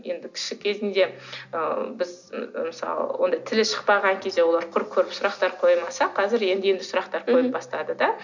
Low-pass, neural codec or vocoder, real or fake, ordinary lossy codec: 7.2 kHz; none; real; none